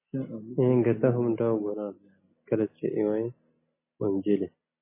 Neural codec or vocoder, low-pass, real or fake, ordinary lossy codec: none; 3.6 kHz; real; MP3, 16 kbps